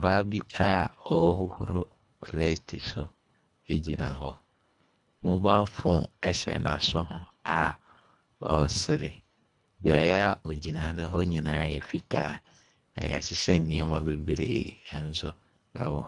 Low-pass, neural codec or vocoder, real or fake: 10.8 kHz; codec, 24 kHz, 1.5 kbps, HILCodec; fake